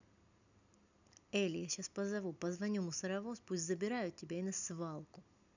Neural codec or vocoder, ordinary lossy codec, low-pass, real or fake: none; none; 7.2 kHz; real